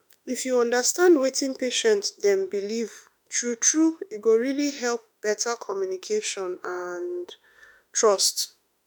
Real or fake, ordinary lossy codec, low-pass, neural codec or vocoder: fake; none; none; autoencoder, 48 kHz, 32 numbers a frame, DAC-VAE, trained on Japanese speech